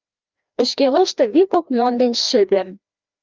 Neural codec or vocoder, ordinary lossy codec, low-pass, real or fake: codec, 16 kHz, 1 kbps, FreqCodec, larger model; Opus, 32 kbps; 7.2 kHz; fake